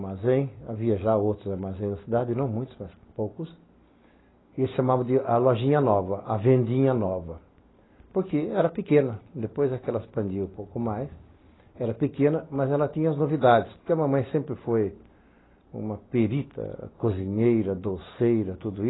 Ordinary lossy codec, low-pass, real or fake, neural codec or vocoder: AAC, 16 kbps; 7.2 kHz; real; none